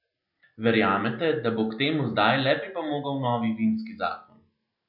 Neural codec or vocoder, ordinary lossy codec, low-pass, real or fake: none; none; 5.4 kHz; real